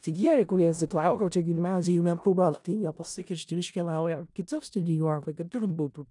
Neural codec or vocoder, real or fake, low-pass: codec, 16 kHz in and 24 kHz out, 0.4 kbps, LongCat-Audio-Codec, four codebook decoder; fake; 10.8 kHz